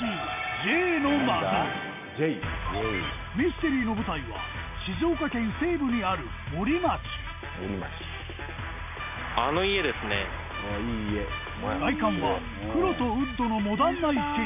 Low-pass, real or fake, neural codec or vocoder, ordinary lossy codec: 3.6 kHz; real; none; none